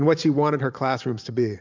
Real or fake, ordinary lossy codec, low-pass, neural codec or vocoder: fake; MP3, 64 kbps; 7.2 kHz; vocoder, 44.1 kHz, 128 mel bands every 512 samples, BigVGAN v2